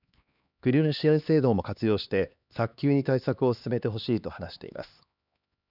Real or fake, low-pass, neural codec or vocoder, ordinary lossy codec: fake; 5.4 kHz; codec, 16 kHz, 2 kbps, X-Codec, HuBERT features, trained on LibriSpeech; none